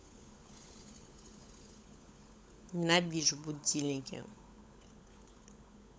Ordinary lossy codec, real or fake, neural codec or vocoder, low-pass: none; fake; codec, 16 kHz, 16 kbps, FunCodec, trained on LibriTTS, 50 frames a second; none